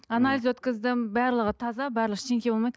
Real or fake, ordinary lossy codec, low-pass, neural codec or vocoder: real; none; none; none